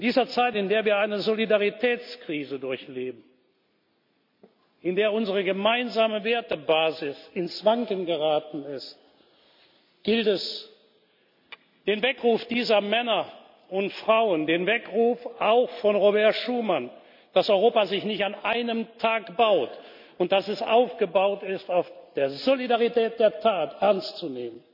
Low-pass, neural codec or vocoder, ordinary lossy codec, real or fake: 5.4 kHz; none; MP3, 48 kbps; real